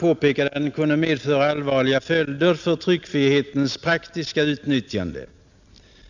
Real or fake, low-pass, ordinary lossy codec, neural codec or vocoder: real; 7.2 kHz; none; none